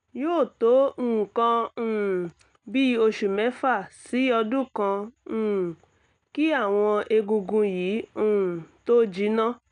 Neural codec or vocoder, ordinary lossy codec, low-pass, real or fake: none; none; 9.9 kHz; real